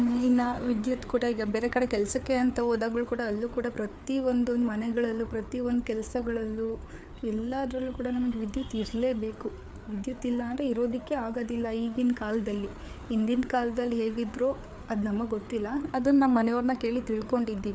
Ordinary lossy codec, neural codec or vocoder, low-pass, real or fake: none; codec, 16 kHz, 4 kbps, FreqCodec, larger model; none; fake